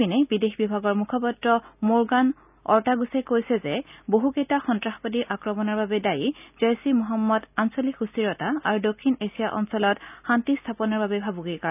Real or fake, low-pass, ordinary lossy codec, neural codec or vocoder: real; 3.6 kHz; none; none